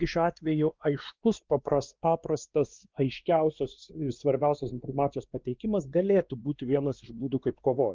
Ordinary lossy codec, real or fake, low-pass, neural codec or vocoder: Opus, 32 kbps; fake; 7.2 kHz; codec, 16 kHz, 2 kbps, X-Codec, WavLM features, trained on Multilingual LibriSpeech